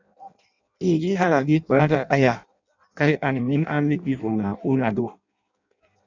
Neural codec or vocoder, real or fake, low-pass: codec, 16 kHz in and 24 kHz out, 0.6 kbps, FireRedTTS-2 codec; fake; 7.2 kHz